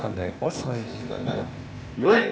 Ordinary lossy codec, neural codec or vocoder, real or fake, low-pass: none; codec, 16 kHz, 0.8 kbps, ZipCodec; fake; none